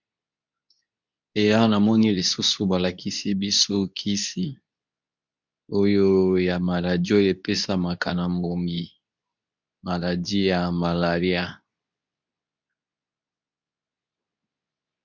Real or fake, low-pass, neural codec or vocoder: fake; 7.2 kHz; codec, 24 kHz, 0.9 kbps, WavTokenizer, medium speech release version 2